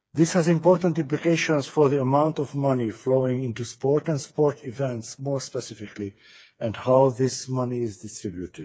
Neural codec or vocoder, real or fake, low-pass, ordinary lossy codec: codec, 16 kHz, 4 kbps, FreqCodec, smaller model; fake; none; none